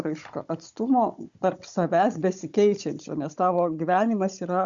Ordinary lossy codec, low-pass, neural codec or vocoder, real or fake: Opus, 64 kbps; 7.2 kHz; codec, 16 kHz, 16 kbps, FunCodec, trained on Chinese and English, 50 frames a second; fake